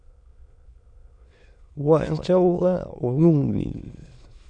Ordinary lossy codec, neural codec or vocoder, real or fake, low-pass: MP3, 64 kbps; autoencoder, 22.05 kHz, a latent of 192 numbers a frame, VITS, trained on many speakers; fake; 9.9 kHz